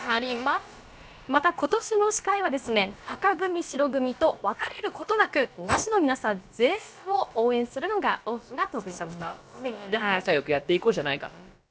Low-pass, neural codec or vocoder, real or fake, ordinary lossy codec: none; codec, 16 kHz, about 1 kbps, DyCAST, with the encoder's durations; fake; none